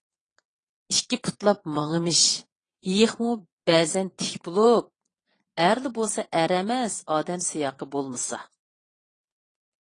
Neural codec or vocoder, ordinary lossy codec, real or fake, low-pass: vocoder, 22.05 kHz, 80 mel bands, Vocos; AAC, 32 kbps; fake; 9.9 kHz